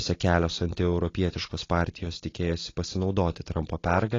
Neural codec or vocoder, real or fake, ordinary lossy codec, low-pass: none; real; AAC, 32 kbps; 7.2 kHz